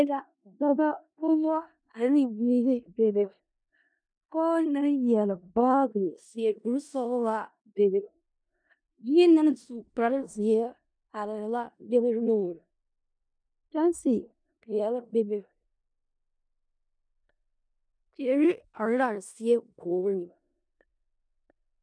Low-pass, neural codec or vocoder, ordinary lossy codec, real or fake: 9.9 kHz; codec, 16 kHz in and 24 kHz out, 0.4 kbps, LongCat-Audio-Codec, four codebook decoder; MP3, 96 kbps; fake